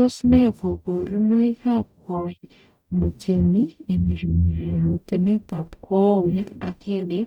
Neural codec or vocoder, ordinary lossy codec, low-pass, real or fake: codec, 44.1 kHz, 0.9 kbps, DAC; none; 19.8 kHz; fake